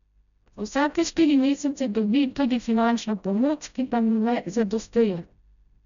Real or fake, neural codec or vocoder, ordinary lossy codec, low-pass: fake; codec, 16 kHz, 0.5 kbps, FreqCodec, smaller model; none; 7.2 kHz